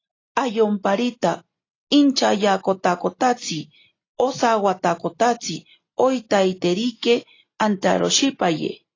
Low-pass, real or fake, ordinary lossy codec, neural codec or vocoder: 7.2 kHz; real; AAC, 32 kbps; none